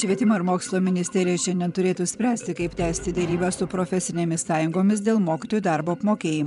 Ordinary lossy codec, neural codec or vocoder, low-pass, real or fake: MP3, 96 kbps; vocoder, 24 kHz, 100 mel bands, Vocos; 10.8 kHz; fake